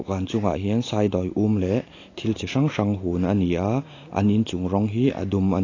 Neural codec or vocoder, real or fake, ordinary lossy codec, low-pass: none; real; AAC, 32 kbps; 7.2 kHz